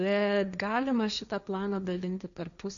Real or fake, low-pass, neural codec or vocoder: fake; 7.2 kHz; codec, 16 kHz, 1.1 kbps, Voila-Tokenizer